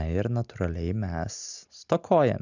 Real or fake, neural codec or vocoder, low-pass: real; none; 7.2 kHz